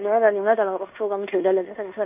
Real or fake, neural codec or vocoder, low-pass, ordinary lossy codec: fake; codec, 16 kHz in and 24 kHz out, 0.9 kbps, LongCat-Audio-Codec, fine tuned four codebook decoder; 3.6 kHz; none